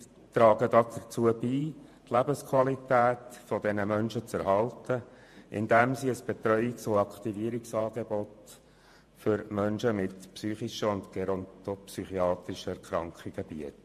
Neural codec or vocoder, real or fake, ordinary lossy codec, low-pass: vocoder, 48 kHz, 128 mel bands, Vocos; fake; MP3, 64 kbps; 14.4 kHz